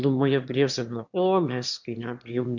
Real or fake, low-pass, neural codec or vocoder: fake; 7.2 kHz; autoencoder, 22.05 kHz, a latent of 192 numbers a frame, VITS, trained on one speaker